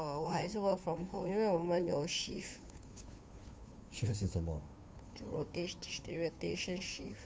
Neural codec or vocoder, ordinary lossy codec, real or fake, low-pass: codec, 16 kHz, 2 kbps, FunCodec, trained on Chinese and English, 25 frames a second; none; fake; none